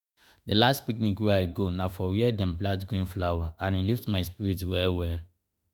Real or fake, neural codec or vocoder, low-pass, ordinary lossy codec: fake; autoencoder, 48 kHz, 32 numbers a frame, DAC-VAE, trained on Japanese speech; none; none